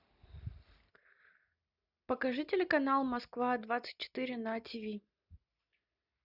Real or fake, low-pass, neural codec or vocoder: real; 5.4 kHz; none